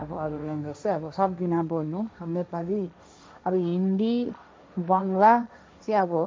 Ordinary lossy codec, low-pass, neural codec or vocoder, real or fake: none; none; codec, 16 kHz, 1.1 kbps, Voila-Tokenizer; fake